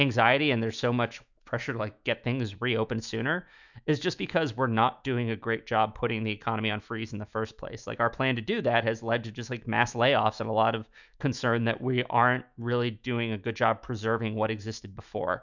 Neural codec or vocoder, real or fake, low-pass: autoencoder, 48 kHz, 128 numbers a frame, DAC-VAE, trained on Japanese speech; fake; 7.2 kHz